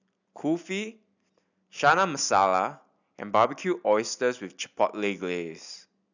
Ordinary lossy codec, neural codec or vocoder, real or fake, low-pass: none; none; real; 7.2 kHz